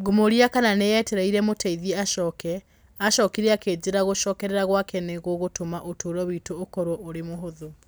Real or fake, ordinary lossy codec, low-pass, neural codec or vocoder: real; none; none; none